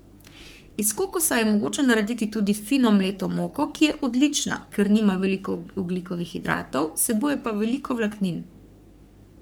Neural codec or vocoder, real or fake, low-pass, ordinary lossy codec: codec, 44.1 kHz, 3.4 kbps, Pupu-Codec; fake; none; none